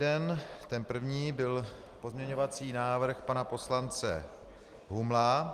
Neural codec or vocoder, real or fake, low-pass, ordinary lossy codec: none; real; 14.4 kHz; Opus, 24 kbps